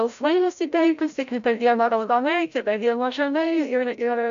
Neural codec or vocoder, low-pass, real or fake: codec, 16 kHz, 0.5 kbps, FreqCodec, larger model; 7.2 kHz; fake